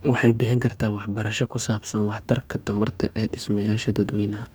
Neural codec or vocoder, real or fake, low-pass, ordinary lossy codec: codec, 44.1 kHz, 2.6 kbps, DAC; fake; none; none